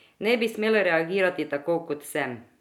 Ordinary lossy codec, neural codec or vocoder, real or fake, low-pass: none; none; real; 19.8 kHz